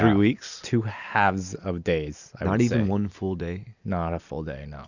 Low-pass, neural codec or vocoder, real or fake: 7.2 kHz; none; real